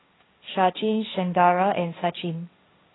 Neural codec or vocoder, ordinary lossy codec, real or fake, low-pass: codec, 16 kHz, 1.1 kbps, Voila-Tokenizer; AAC, 16 kbps; fake; 7.2 kHz